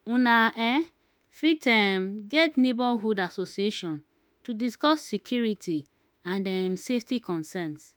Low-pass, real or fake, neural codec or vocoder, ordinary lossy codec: none; fake; autoencoder, 48 kHz, 32 numbers a frame, DAC-VAE, trained on Japanese speech; none